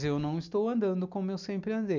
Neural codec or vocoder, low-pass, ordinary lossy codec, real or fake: none; 7.2 kHz; none; real